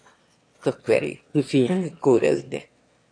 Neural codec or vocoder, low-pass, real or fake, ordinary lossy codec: autoencoder, 22.05 kHz, a latent of 192 numbers a frame, VITS, trained on one speaker; 9.9 kHz; fake; AAC, 48 kbps